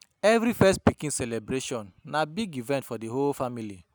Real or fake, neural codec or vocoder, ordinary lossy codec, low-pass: real; none; none; none